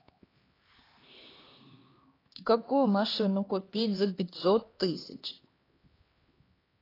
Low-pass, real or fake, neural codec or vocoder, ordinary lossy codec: 5.4 kHz; fake; codec, 16 kHz, 2 kbps, X-Codec, HuBERT features, trained on LibriSpeech; AAC, 24 kbps